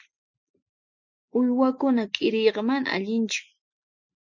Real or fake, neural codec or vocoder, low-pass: real; none; 7.2 kHz